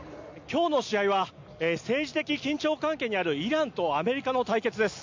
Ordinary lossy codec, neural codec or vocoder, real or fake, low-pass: MP3, 64 kbps; none; real; 7.2 kHz